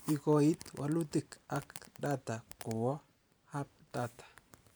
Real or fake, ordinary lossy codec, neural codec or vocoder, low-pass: fake; none; vocoder, 44.1 kHz, 128 mel bands every 512 samples, BigVGAN v2; none